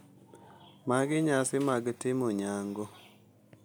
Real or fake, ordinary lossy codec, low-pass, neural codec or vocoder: real; none; none; none